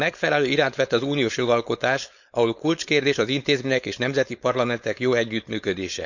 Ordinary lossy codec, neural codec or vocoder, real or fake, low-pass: none; codec, 16 kHz, 4.8 kbps, FACodec; fake; 7.2 kHz